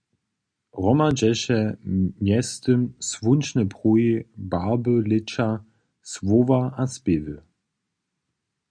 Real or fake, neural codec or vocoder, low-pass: real; none; 9.9 kHz